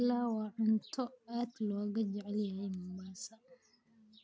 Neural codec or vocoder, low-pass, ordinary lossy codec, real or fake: none; none; none; real